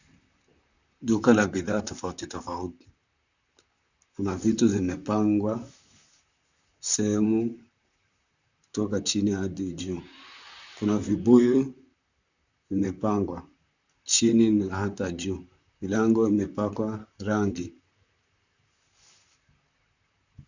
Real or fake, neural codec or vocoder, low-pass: fake; vocoder, 44.1 kHz, 128 mel bands, Pupu-Vocoder; 7.2 kHz